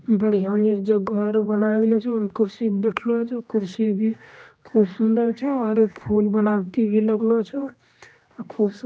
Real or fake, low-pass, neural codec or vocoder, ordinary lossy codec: fake; none; codec, 16 kHz, 1 kbps, X-Codec, HuBERT features, trained on general audio; none